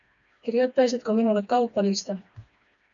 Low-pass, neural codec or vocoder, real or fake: 7.2 kHz; codec, 16 kHz, 2 kbps, FreqCodec, smaller model; fake